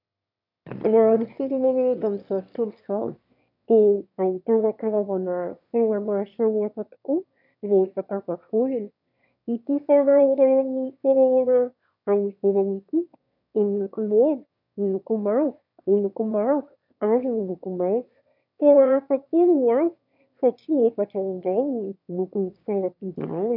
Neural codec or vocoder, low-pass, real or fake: autoencoder, 22.05 kHz, a latent of 192 numbers a frame, VITS, trained on one speaker; 5.4 kHz; fake